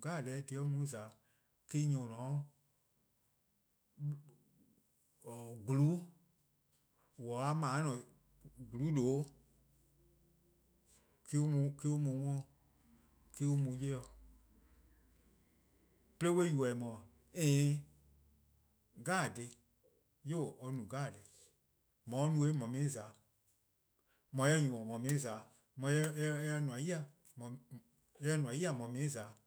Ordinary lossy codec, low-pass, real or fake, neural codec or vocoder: none; none; fake; autoencoder, 48 kHz, 128 numbers a frame, DAC-VAE, trained on Japanese speech